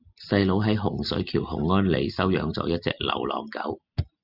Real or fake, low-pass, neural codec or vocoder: real; 5.4 kHz; none